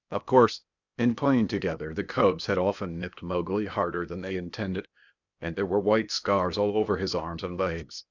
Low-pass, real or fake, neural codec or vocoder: 7.2 kHz; fake; codec, 16 kHz, 0.8 kbps, ZipCodec